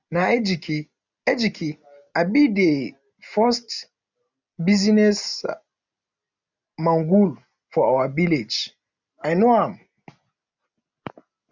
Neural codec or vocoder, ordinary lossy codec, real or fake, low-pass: none; none; real; 7.2 kHz